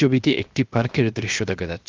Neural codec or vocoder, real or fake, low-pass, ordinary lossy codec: codec, 16 kHz, 0.7 kbps, FocalCodec; fake; 7.2 kHz; Opus, 32 kbps